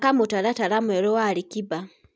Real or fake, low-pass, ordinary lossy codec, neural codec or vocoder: real; none; none; none